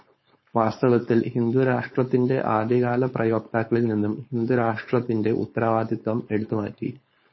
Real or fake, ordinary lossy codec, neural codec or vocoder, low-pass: fake; MP3, 24 kbps; codec, 16 kHz, 4.8 kbps, FACodec; 7.2 kHz